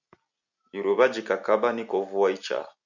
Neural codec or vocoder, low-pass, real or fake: none; 7.2 kHz; real